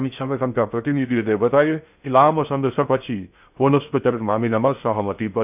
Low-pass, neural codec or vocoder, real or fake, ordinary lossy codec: 3.6 kHz; codec, 16 kHz in and 24 kHz out, 0.6 kbps, FocalCodec, streaming, 2048 codes; fake; none